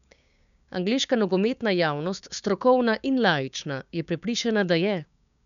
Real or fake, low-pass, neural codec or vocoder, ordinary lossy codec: fake; 7.2 kHz; codec, 16 kHz, 6 kbps, DAC; none